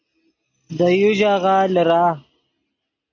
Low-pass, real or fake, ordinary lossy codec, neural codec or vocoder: 7.2 kHz; real; AAC, 48 kbps; none